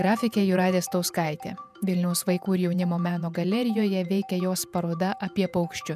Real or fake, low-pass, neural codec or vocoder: fake; 14.4 kHz; vocoder, 48 kHz, 128 mel bands, Vocos